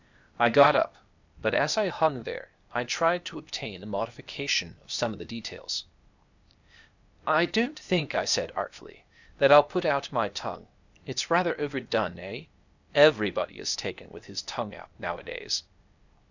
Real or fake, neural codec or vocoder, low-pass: fake; codec, 16 kHz, 0.8 kbps, ZipCodec; 7.2 kHz